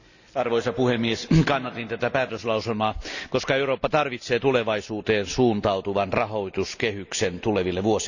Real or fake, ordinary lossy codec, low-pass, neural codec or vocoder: real; none; 7.2 kHz; none